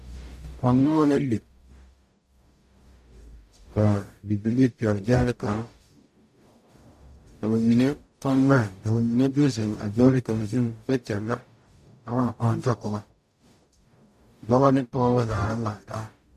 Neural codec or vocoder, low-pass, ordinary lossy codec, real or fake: codec, 44.1 kHz, 0.9 kbps, DAC; 14.4 kHz; MP3, 64 kbps; fake